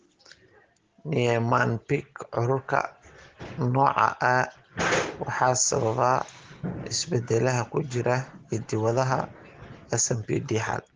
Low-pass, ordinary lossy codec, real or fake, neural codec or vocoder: 7.2 kHz; Opus, 16 kbps; real; none